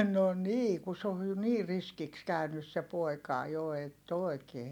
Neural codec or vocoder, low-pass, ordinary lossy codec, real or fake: none; 19.8 kHz; none; real